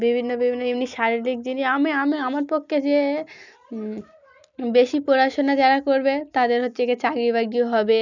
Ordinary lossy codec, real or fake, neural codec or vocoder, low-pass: none; real; none; 7.2 kHz